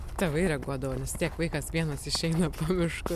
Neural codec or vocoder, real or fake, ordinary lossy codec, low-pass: none; real; MP3, 96 kbps; 14.4 kHz